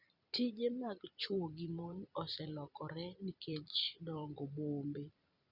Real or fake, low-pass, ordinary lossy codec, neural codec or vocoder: fake; 5.4 kHz; Opus, 64 kbps; vocoder, 44.1 kHz, 128 mel bands every 256 samples, BigVGAN v2